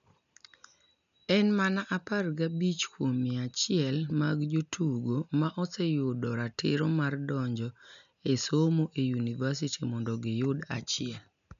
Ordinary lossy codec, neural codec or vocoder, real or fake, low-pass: none; none; real; 7.2 kHz